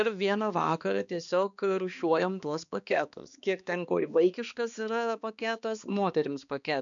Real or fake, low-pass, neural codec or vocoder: fake; 7.2 kHz; codec, 16 kHz, 2 kbps, X-Codec, HuBERT features, trained on balanced general audio